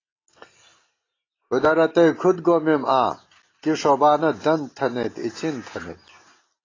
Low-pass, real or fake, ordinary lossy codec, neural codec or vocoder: 7.2 kHz; real; AAC, 32 kbps; none